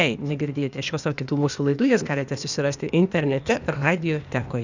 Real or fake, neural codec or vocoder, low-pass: fake; codec, 16 kHz, 0.8 kbps, ZipCodec; 7.2 kHz